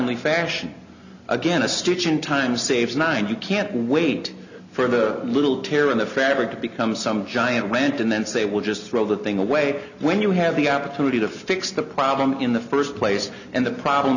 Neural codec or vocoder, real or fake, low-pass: none; real; 7.2 kHz